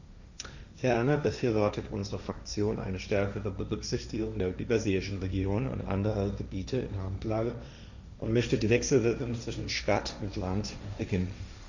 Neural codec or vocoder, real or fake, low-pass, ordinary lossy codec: codec, 16 kHz, 1.1 kbps, Voila-Tokenizer; fake; 7.2 kHz; none